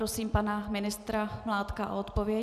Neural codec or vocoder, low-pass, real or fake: none; 14.4 kHz; real